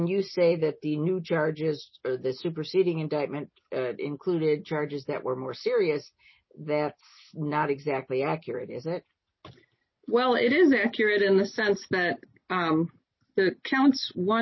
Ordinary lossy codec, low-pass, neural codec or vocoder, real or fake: MP3, 24 kbps; 7.2 kHz; none; real